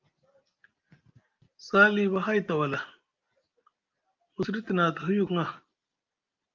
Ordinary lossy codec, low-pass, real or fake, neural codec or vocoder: Opus, 24 kbps; 7.2 kHz; real; none